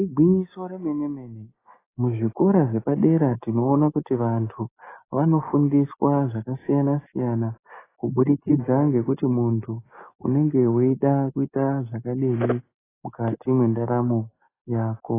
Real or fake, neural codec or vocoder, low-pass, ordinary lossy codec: real; none; 3.6 kHz; AAC, 16 kbps